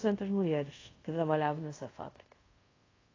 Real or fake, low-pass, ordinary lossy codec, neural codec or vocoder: fake; 7.2 kHz; AAC, 32 kbps; codec, 24 kHz, 0.5 kbps, DualCodec